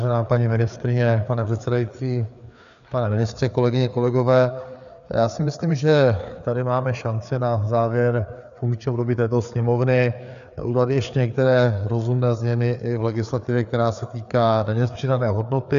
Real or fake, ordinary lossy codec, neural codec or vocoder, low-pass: fake; MP3, 96 kbps; codec, 16 kHz, 4 kbps, FreqCodec, larger model; 7.2 kHz